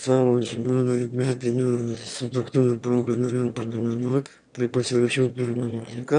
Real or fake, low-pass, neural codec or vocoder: fake; 9.9 kHz; autoencoder, 22.05 kHz, a latent of 192 numbers a frame, VITS, trained on one speaker